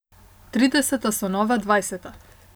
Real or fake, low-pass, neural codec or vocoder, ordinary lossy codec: real; none; none; none